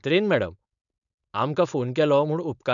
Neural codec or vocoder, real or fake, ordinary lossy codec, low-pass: codec, 16 kHz, 4.8 kbps, FACodec; fake; none; 7.2 kHz